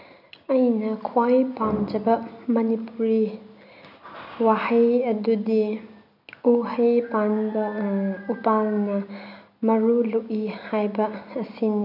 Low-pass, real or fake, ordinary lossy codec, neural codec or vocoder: 5.4 kHz; real; none; none